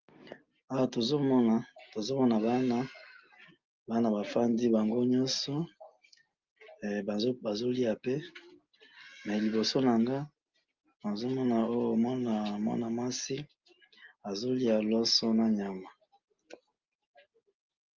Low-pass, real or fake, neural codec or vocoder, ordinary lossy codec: 7.2 kHz; real; none; Opus, 32 kbps